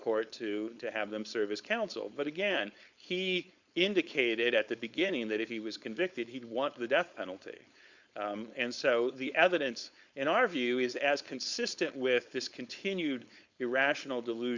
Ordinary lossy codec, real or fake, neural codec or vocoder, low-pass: Opus, 64 kbps; fake; codec, 16 kHz, 4.8 kbps, FACodec; 7.2 kHz